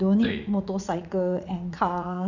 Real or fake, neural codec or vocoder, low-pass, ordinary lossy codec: fake; vocoder, 44.1 kHz, 80 mel bands, Vocos; 7.2 kHz; none